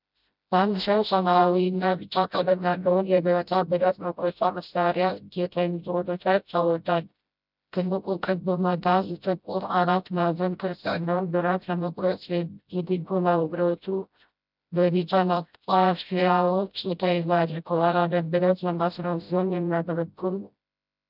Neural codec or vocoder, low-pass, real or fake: codec, 16 kHz, 0.5 kbps, FreqCodec, smaller model; 5.4 kHz; fake